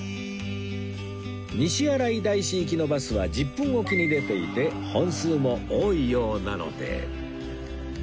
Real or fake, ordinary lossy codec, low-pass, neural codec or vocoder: real; none; none; none